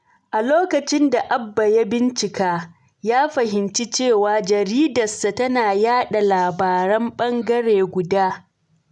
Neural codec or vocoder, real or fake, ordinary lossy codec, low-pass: none; real; none; 10.8 kHz